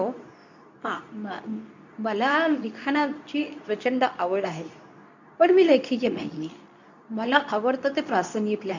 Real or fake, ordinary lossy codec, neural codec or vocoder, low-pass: fake; none; codec, 24 kHz, 0.9 kbps, WavTokenizer, medium speech release version 2; 7.2 kHz